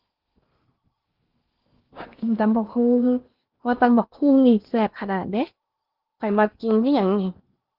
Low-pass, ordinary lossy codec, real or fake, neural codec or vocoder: 5.4 kHz; Opus, 32 kbps; fake; codec, 16 kHz in and 24 kHz out, 0.8 kbps, FocalCodec, streaming, 65536 codes